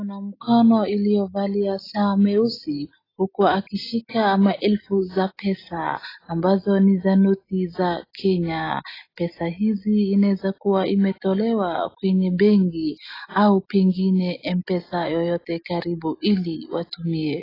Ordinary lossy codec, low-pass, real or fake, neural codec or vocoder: AAC, 24 kbps; 5.4 kHz; real; none